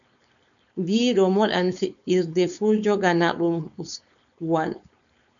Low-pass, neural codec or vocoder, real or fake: 7.2 kHz; codec, 16 kHz, 4.8 kbps, FACodec; fake